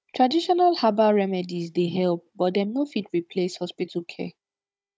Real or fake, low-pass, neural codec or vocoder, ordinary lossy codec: fake; none; codec, 16 kHz, 16 kbps, FunCodec, trained on Chinese and English, 50 frames a second; none